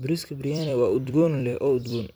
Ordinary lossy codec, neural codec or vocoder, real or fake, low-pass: none; vocoder, 44.1 kHz, 128 mel bands every 256 samples, BigVGAN v2; fake; none